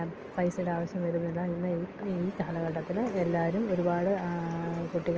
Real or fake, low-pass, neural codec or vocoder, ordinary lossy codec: real; 7.2 kHz; none; Opus, 24 kbps